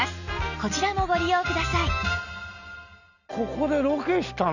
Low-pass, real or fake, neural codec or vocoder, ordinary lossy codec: 7.2 kHz; real; none; none